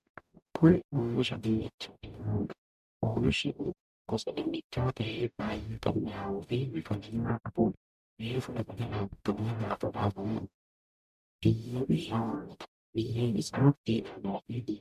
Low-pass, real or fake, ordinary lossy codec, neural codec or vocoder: 14.4 kHz; fake; MP3, 96 kbps; codec, 44.1 kHz, 0.9 kbps, DAC